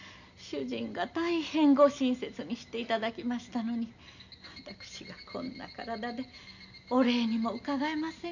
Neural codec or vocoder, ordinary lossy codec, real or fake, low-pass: none; none; real; 7.2 kHz